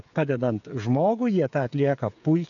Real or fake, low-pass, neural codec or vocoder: fake; 7.2 kHz; codec, 16 kHz, 8 kbps, FreqCodec, smaller model